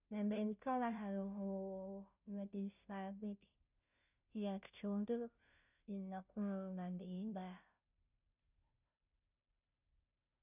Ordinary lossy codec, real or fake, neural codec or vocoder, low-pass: none; fake; codec, 16 kHz, 0.5 kbps, FunCodec, trained on Chinese and English, 25 frames a second; 3.6 kHz